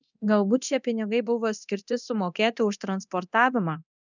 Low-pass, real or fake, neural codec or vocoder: 7.2 kHz; fake; codec, 24 kHz, 0.9 kbps, DualCodec